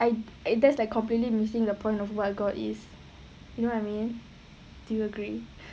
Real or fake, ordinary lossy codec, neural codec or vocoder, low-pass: real; none; none; none